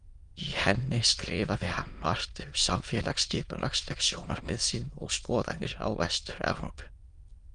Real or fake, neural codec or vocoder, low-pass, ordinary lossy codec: fake; autoencoder, 22.05 kHz, a latent of 192 numbers a frame, VITS, trained on many speakers; 9.9 kHz; Opus, 32 kbps